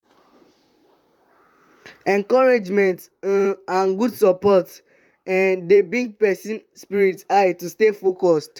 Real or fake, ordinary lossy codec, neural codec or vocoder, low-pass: fake; none; vocoder, 44.1 kHz, 128 mel bands, Pupu-Vocoder; 19.8 kHz